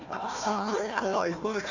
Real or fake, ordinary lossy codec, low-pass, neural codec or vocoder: fake; none; 7.2 kHz; codec, 16 kHz, 1 kbps, FunCodec, trained on Chinese and English, 50 frames a second